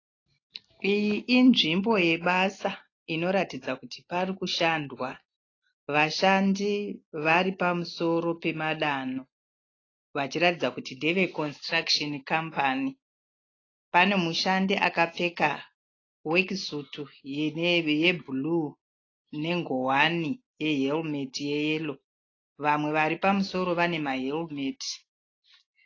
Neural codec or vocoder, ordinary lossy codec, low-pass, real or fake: none; AAC, 32 kbps; 7.2 kHz; real